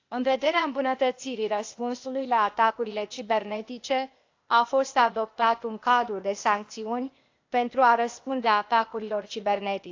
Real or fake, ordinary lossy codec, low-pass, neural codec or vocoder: fake; AAC, 48 kbps; 7.2 kHz; codec, 16 kHz, 0.8 kbps, ZipCodec